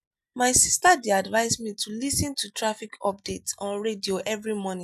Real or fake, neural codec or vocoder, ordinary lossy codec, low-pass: real; none; none; 14.4 kHz